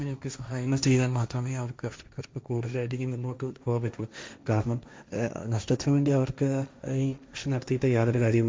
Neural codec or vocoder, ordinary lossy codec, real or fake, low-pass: codec, 16 kHz, 1.1 kbps, Voila-Tokenizer; none; fake; none